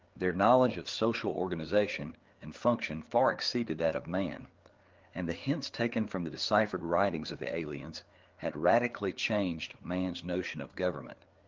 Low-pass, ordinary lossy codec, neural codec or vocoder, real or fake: 7.2 kHz; Opus, 16 kbps; codec, 16 kHz, 4 kbps, FreqCodec, larger model; fake